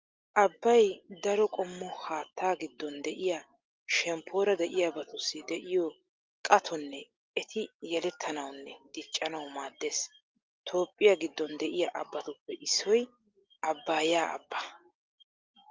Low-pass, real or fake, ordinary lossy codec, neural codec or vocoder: 7.2 kHz; real; Opus, 32 kbps; none